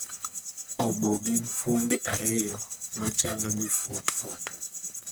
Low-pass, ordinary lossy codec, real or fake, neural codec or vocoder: none; none; fake; codec, 44.1 kHz, 1.7 kbps, Pupu-Codec